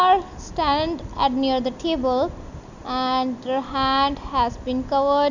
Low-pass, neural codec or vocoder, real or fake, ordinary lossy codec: 7.2 kHz; none; real; none